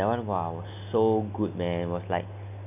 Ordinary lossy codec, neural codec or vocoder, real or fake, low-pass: none; none; real; 3.6 kHz